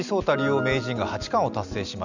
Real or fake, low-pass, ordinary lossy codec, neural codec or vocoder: real; 7.2 kHz; none; none